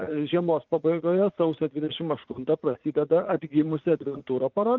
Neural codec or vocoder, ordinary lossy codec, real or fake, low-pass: vocoder, 44.1 kHz, 80 mel bands, Vocos; Opus, 32 kbps; fake; 7.2 kHz